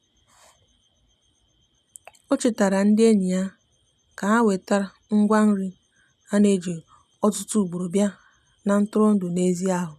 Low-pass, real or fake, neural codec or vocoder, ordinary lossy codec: 14.4 kHz; real; none; none